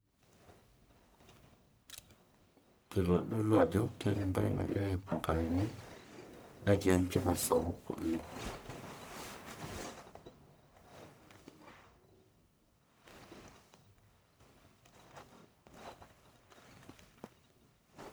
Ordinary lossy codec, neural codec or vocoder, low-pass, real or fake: none; codec, 44.1 kHz, 1.7 kbps, Pupu-Codec; none; fake